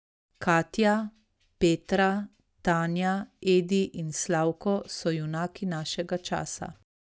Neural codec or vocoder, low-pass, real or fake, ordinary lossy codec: none; none; real; none